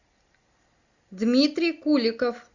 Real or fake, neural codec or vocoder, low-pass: real; none; 7.2 kHz